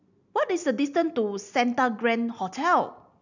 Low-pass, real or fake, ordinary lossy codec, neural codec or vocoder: 7.2 kHz; real; none; none